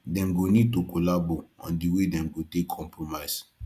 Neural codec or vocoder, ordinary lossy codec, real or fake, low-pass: none; Opus, 64 kbps; real; 14.4 kHz